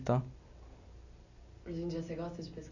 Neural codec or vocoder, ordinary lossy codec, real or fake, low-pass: none; none; real; 7.2 kHz